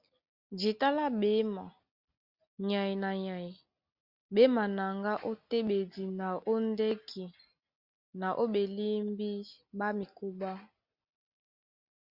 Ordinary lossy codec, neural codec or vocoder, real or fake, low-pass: Opus, 64 kbps; none; real; 5.4 kHz